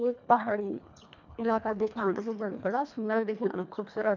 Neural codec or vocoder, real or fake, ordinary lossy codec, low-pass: codec, 24 kHz, 1.5 kbps, HILCodec; fake; none; 7.2 kHz